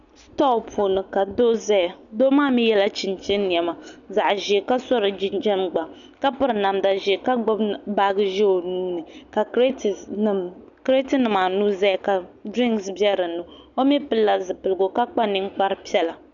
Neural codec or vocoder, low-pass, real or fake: none; 7.2 kHz; real